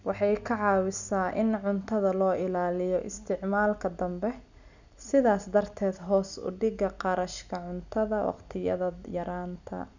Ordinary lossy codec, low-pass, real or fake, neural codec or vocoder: none; 7.2 kHz; real; none